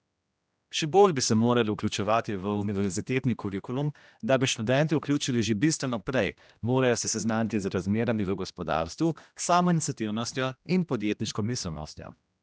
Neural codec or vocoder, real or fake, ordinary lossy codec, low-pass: codec, 16 kHz, 1 kbps, X-Codec, HuBERT features, trained on general audio; fake; none; none